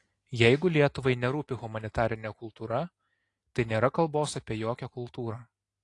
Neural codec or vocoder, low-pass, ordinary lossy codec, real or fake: none; 10.8 kHz; AAC, 48 kbps; real